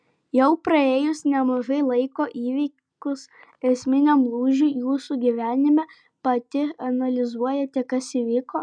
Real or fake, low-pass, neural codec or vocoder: real; 9.9 kHz; none